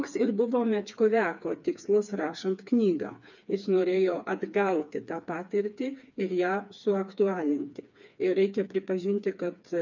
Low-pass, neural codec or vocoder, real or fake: 7.2 kHz; codec, 16 kHz, 4 kbps, FreqCodec, smaller model; fake